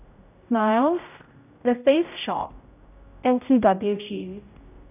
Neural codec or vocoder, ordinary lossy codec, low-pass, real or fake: codec, 16 kHz, 0.5 kbps, X-Codec, HuBERT features, trained on general audio; none; 3.6 kHz; fake